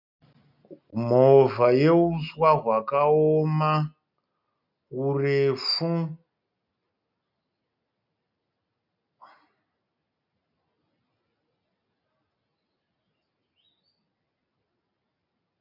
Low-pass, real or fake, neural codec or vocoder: 5.4 kHz; real; none